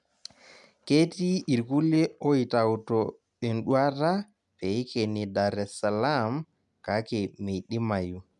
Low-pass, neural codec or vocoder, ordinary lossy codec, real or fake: 10.8 kHz; none; none; real